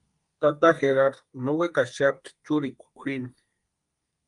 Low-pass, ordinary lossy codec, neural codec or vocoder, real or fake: 10.8 kHz; Opus, 32 kbps; codec, 32 kHz, 1.9 kbps, SNAC; fake